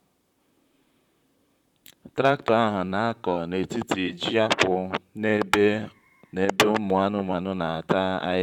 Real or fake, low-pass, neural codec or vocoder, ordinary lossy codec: fake; 19.8 kHz; vocoder, 44.1 kHz, 128 mel bands, Pupu-Vocoder; none